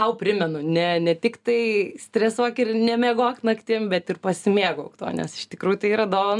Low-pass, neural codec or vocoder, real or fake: 10.8 kHz; none; real